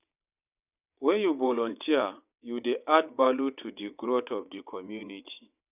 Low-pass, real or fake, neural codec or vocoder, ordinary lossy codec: 3.6 kHz; fake; vocoder, 22.05 kHz, 80 mel bands, WaveNeXt; none